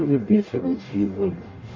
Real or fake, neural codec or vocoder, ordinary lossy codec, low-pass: fake; codec, 44.1 kHz, 0.9 kbps, DAC; MP3, 32 kbps; 7.2 kHz